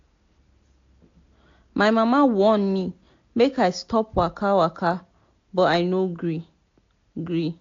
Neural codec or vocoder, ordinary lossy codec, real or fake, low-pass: none; AAC, 48 kbps; real; 7.2 kHz